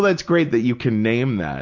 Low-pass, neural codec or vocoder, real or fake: 7.2 kHz; none; real